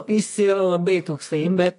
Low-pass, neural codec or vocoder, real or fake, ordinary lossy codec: 10.8 kHz; codec, 24 kHz, 0.9 kbps, WavTokenizer, medium music audio release; fake; AAC, 64 kbps